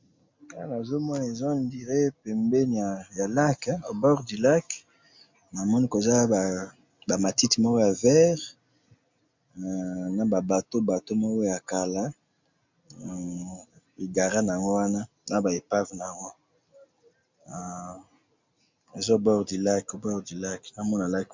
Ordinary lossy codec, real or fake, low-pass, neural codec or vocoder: MP3, 64 kbps; real; 7.2 kHz; none